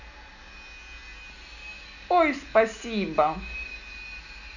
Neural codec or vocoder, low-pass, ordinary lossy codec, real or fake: none; 7.2 kHz; none; real